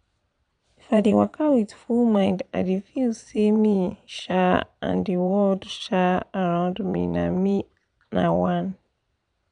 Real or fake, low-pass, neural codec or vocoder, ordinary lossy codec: fake; 9.9 kHz; vocoder, 22.05 kHz, 80 mel bands, Vocos; none